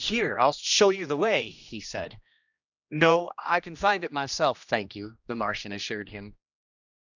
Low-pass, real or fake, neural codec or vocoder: 7.2 kHz; fake; codec, 16 kHz, 1 kbps, X-Codec, HuBERT features, trained on general audio